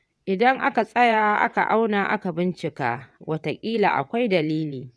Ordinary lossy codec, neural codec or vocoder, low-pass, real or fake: none; vocoder, 22.05 kHz, 80 mel bands, WaveNeXt; none; fake